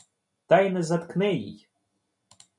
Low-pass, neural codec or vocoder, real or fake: 10.8 kHz; none; real